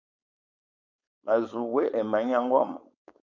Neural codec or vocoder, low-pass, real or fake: codec, 16 kHz, 4.8 kbps, FACodec; 7.2 kHz; fake